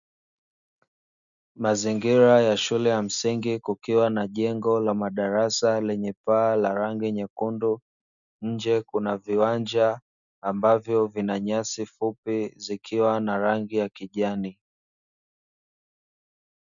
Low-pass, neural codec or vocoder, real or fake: 7.2 kHz; none; real